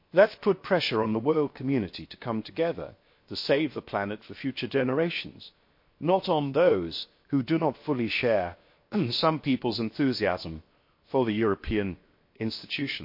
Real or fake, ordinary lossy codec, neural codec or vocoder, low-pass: fake; MP3, 32 kbps; codec, 16 kHz, 0.7 kbps, FocalCodec; 5.4 kHz